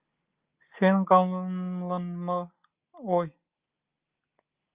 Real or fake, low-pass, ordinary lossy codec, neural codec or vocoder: real; 3.6 kHz; Opus, 32 kbps; none